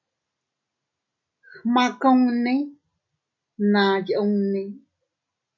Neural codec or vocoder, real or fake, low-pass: none; real; 7.2 kHz